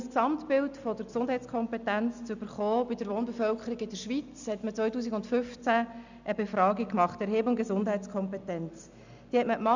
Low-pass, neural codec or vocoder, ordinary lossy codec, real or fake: 7.2 kHz; none; none; real